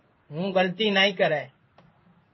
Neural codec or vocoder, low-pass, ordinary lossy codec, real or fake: codec, 16 kHz in and 24 kHz out, 1 kbps, XY-Tokenizer; 7.2 kHz; MP3, 24 kbps; fake